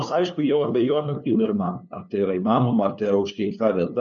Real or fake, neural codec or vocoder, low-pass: fake; codec, 16 kHz, 2 kbps, FunCodec, trained on LibriTTS, 25 frames a second; 7.2 kHz